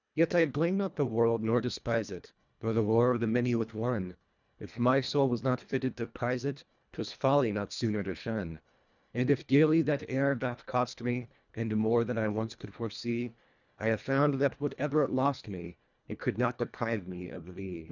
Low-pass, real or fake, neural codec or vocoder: 7.2 kHz; fake; codec, 24 kHz, 1.5 kbps, HILCodec